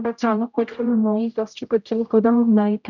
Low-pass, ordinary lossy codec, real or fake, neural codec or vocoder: 7.2 kHz; none; fake; codec, 16 kHz, 0.5 kbps, X-Codec, HuBERT features, trained on general audio